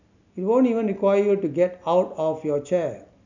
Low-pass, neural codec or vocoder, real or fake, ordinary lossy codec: 7.2 kHz; none; real; none